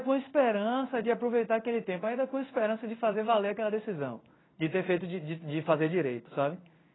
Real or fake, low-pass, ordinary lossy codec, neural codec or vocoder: fake; 7.2 kHz; AAC, 16 kbps; codec, 16 kHz in and 24 kHz out, 1 kbps, XY-Tokenizer